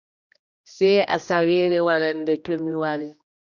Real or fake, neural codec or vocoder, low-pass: fake; codec, 16 kHz, 1 kbps, X-Codec, HuBERT features, trained on general audio; 7.2 kHz